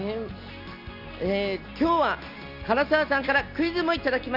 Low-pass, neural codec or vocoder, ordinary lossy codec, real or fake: 5.4 kHz; none; none; real